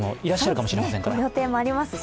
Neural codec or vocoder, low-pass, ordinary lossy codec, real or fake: none; none; none; real